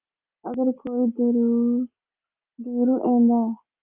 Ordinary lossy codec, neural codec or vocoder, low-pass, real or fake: Opus, 24 kbps; none; 3.6 kHz; real